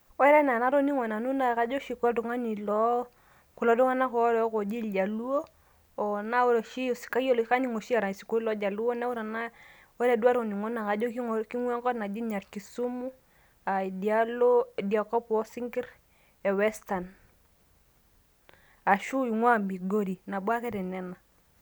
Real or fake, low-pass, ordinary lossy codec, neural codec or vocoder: real; none; none; none